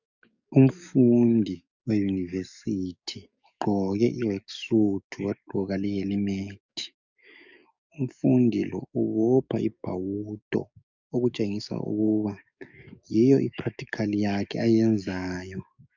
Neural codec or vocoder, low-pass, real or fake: codec, 44.1 kHz, 7.8 kbps, DAC; 7.2 kHz; fake